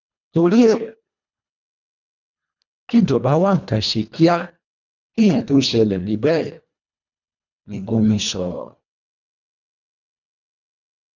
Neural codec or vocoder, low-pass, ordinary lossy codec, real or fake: codec, 24 kHz, 1.5 kbps, HILCodec; 7.2 kHz; none; fake